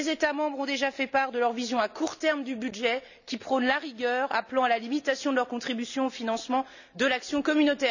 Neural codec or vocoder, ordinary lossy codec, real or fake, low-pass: none; none; real; 7.2 kHz